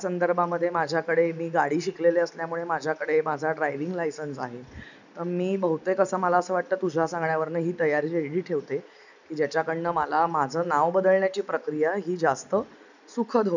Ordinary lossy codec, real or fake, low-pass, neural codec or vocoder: none; real; 7.2 kHz; none